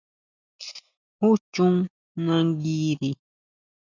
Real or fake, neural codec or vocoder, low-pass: real; none; 7.2 kHz